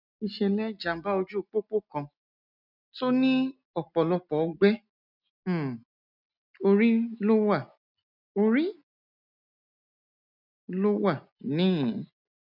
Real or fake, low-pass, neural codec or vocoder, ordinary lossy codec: real; 5.4 kHz; none; none